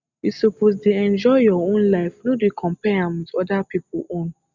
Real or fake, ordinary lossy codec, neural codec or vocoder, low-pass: real; Opus, 64 kbps; none; 7.2 kHz